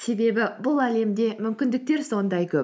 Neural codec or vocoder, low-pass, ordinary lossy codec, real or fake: none; none; none; real